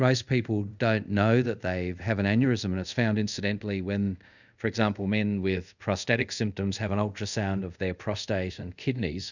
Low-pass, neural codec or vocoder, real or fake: 7.2 kHz; codec, 24 kHz, 0.5 kbps, DualCodec; fake